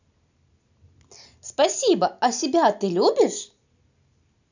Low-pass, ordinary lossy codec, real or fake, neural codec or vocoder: 7.2 kHz; none; real; none